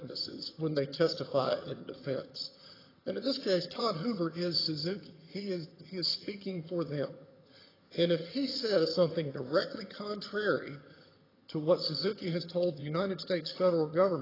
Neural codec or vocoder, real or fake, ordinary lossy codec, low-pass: vocoder, 22.05 kHz, 80 mel bands, HiFi-GAN; fake; AAC, 24 kbps; 5.4 kHz